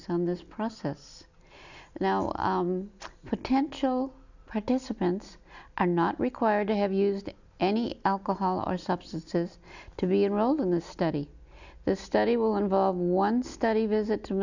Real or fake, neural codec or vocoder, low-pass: real; none; 7.2 kHz